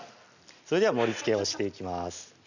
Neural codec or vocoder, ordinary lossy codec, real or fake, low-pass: none; none; real; 7.2 kHz